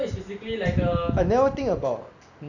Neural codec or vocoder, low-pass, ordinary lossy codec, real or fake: none; 7.2 kHz; none; real